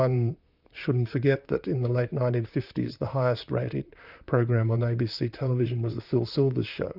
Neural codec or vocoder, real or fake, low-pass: vocoder, 44.1 kHz, 128 mel bands, Pupu-Vocoder; fake; 5.4 kHz